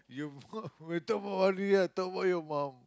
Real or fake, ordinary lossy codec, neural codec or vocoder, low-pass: real; none; none; none